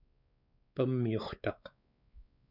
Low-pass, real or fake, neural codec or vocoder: 5.4 kHz; fake; codec, 16 kHz, 4 kbps, X-Codec, WavLM features, trained on Multilingual LibriSpeech